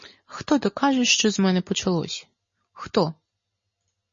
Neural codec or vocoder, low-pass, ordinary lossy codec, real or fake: none; 7.2 kHz; MP3, 32 kbps; real